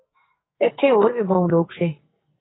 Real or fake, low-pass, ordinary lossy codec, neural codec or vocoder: fake; 7.2 kHz; AAC, 16 kbps; codec, 44.1 kHz, 2.6 kbps, SNAC